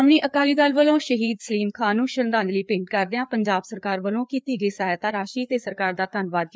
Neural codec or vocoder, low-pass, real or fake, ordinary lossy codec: codec, 16 kHz, 4 kbps, FreqCodec, larger model; none; fake; none